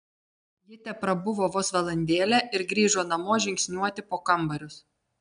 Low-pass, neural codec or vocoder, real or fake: 9.9 kHz; none; real